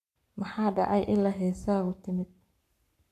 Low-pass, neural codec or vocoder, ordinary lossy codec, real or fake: 14.4 kHz; codec, 44.1 kHz, 7.8 kbps, Pupu-Codec; none; fake